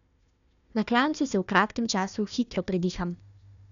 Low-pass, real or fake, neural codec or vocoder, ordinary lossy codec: 7.2 kHz; fake; codec, 16 kHz, 1 kbps, FunCodec, trained on Chinese and English, 50 frames a second; none